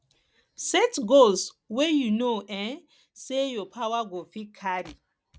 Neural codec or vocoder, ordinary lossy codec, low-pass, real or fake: none; none; none; real